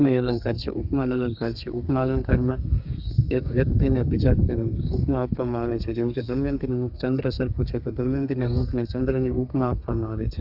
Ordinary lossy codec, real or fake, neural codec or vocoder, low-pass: Opus, 64 kbps; fake; codec, 32 kHz, 1.9 kbps, SNAC; 5.4 kHz